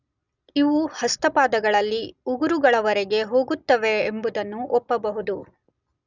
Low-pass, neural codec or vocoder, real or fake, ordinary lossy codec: 7.2 kHz; none; real; none